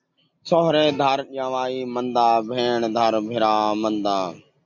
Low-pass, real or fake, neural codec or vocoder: 7.2 kHz; real; none